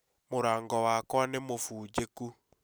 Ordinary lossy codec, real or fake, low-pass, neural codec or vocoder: none; real; none; none